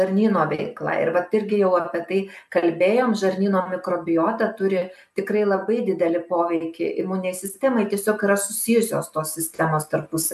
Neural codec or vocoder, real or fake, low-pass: none; real; 14.4 kHz